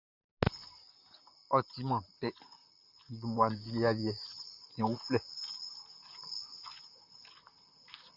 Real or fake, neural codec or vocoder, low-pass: fake; vocoder, 44.1 kHz, 128 mel bands, Pupu-Vocoder; 5.4 kHz